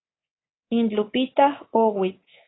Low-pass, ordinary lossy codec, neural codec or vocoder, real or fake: 7.2 kHz; AAC, 16 kbps; codec, 24 kHz, 3.1 kbps, DualCodec; fake